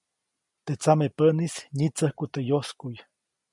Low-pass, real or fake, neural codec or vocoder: 10.8 kHz; real; none